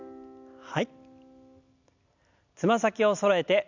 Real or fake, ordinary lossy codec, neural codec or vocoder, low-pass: real; none; none; 7.2 kHz